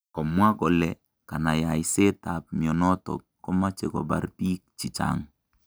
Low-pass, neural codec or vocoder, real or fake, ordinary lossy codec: none; none; real; none